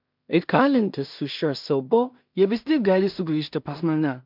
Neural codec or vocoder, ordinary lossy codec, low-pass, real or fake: codec, 16 kHz in and 24 kHz out, 0.4 kbps, LongCat-Audio-Codec, two codebook decoder; MP3, 48 kbps; 5.4 kHz; fake